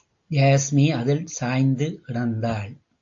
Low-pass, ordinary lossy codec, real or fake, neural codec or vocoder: 7.2 kHz; AAC, 48 kbps; real; none